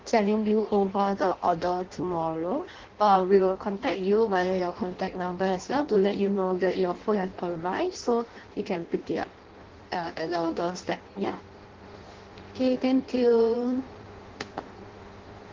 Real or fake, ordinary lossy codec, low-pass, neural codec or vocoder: fake; Opus, 16 kbps; 7.2 kHz; codec, 16 kHz in and 24 kHz out, 0.6 kbps, FireRedTTS-2 codec